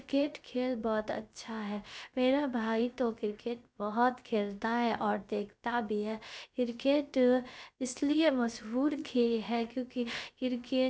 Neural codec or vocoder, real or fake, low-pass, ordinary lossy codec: codec, 16 kHz, about 1 kbps, DyCAST, with the encoder's durations; fake; none; none